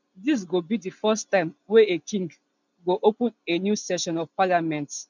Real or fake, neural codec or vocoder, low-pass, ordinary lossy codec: real; none; 7.2 kHz; none